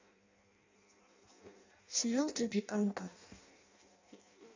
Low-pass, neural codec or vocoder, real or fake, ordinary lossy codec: 7.2 kHz; codec, 16 kHz in and 24 kHz out, 0.6 kbps, FireRedTTS-2 codec; fake; AAC, 48 kbps